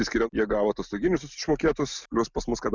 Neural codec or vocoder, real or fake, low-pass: none; real; 7.2 kHz